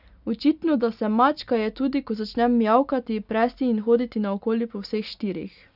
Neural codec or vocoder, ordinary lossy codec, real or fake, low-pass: none; none; real; 5.4 kHz